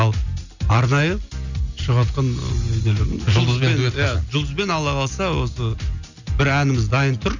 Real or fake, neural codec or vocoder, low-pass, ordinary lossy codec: real; none; 7.2 kHz; none